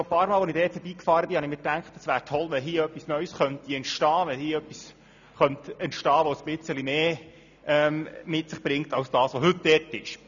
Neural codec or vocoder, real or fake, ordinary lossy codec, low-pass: none; real; none; 7.2 kHz